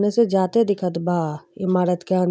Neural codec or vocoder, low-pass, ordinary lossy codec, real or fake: none; none; none; real